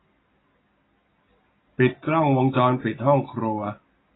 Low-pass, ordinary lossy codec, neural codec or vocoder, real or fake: 7.2 kHz; AAC, 16 kbps; none; real